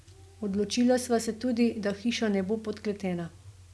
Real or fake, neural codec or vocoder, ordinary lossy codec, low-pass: real; none; none; none